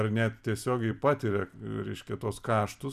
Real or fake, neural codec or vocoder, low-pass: fake; vocoder, 44.1 kHz, 128 mel bands every 256 samples, BigVGAN v2; 14.4 kHz